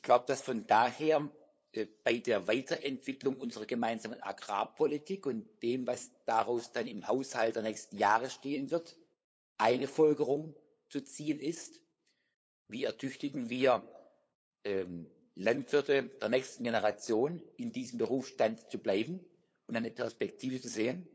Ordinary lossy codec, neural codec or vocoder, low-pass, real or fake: none; codec, 16 kHz, 8 kbps, FunCodec, trained on LibriTTS, 25 frames a second; none; fake